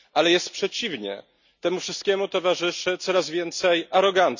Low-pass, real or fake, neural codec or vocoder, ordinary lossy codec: 7.2 kHz; real; none; none